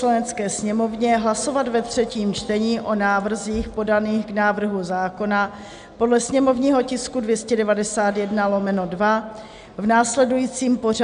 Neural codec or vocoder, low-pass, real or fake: none; 9.9 kHz; real